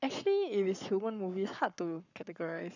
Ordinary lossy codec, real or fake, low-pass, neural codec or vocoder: none; fake; 7.2 kHz; codec, 44.1 kHz, 7.8 kbps, Pupu-Codec